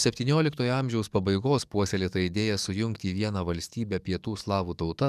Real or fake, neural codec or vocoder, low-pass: fake; codec, 44.1 kHz, 7.8 kbps, DAC; 14.4 kHz